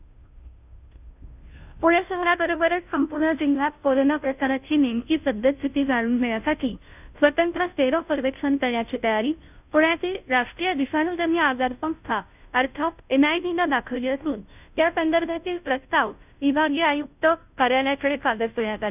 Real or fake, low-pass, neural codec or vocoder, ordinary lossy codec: fake; 3.6 kHz; codec, 16 kHz, 0.5 kbps, FunCodec, trained on Chinese and English, 25 frames a second; none